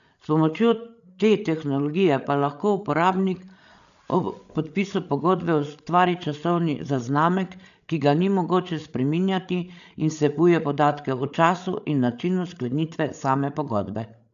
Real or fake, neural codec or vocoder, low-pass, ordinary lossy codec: fake; codec, 16 kHz, 8 kbps, FreqCodec, larger model; 7.2 kHz; none